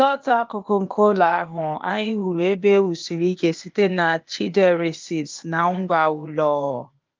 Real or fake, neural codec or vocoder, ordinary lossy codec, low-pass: fake; codec, 16 kHz, 0.8 kbps, ZipCodec; Opus, 24 kbps; 7.2 kHz